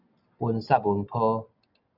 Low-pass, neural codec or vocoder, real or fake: 5.4 kHz; none; real